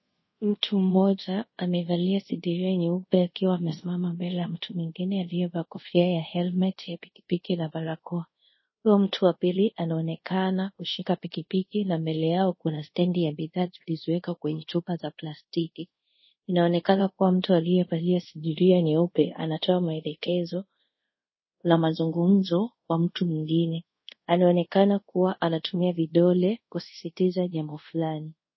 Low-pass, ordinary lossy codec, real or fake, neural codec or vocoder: 7.2 kHz; MP3, 24 kbps; fake; codec, 24 kHz, 0.5 kbps, DualCodec